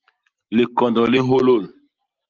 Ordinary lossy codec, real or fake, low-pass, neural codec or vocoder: Opus, 24 kbps; real; 7.2 kHz; none